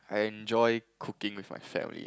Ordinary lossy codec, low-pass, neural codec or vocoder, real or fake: none; none; none; real